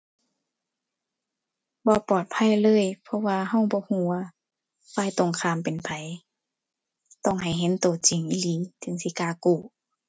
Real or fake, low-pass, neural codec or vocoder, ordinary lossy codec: real; none; none; none